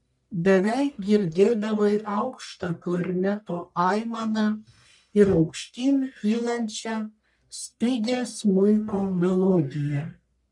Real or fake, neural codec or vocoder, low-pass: fake; codec, 44.1 kHz, 1.7 kbps, Pupu-Codec; 10.8 kHz